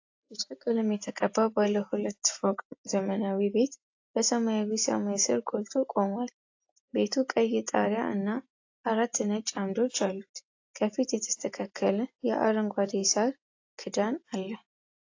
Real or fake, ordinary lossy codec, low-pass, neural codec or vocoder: real; AAC, 48 kbps; 7.2 kHz; none